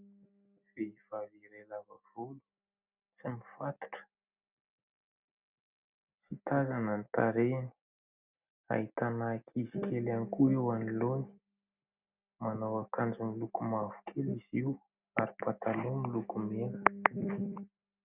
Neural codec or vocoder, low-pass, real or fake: none; 3.6 kHz; real